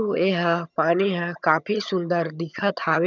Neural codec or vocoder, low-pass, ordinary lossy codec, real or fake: vocoder, 22.05 kHz, 80 mel bands, HiFi-GAN; 7.2 kHz; none; fake